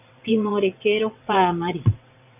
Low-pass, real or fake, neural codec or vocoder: 3.6 kHz; fake; vocoder, 22.05 kHz, 80 mel bands, WaveNeXt